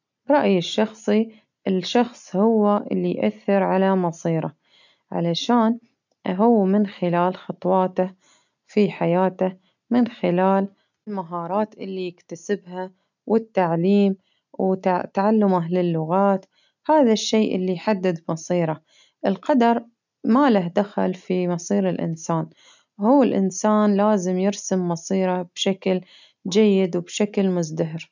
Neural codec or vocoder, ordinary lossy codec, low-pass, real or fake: none; none; 7.2 kHz; real